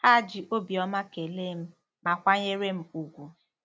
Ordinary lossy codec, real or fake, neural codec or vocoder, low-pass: none; real; none; none